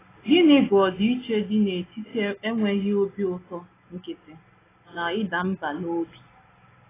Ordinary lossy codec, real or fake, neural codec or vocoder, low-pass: AAC, 16 kbps; real; none; 3.6 kHz